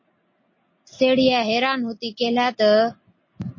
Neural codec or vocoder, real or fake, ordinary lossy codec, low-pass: none; real; MP3, 32 kbps; 7.2 kHz